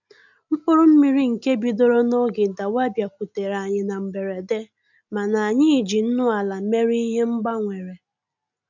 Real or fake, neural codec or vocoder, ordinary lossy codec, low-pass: real; none; none; 7.2 kHz